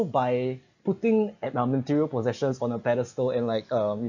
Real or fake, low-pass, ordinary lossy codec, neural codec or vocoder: real; 7.2 kHz; none; none